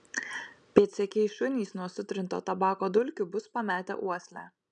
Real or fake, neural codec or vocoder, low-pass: real; none; 9.9 kHz